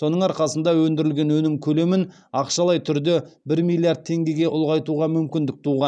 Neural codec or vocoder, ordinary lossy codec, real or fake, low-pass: none; none; real; 9.9 kHz